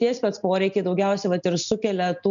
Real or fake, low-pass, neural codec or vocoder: real; 7.2 kHz; none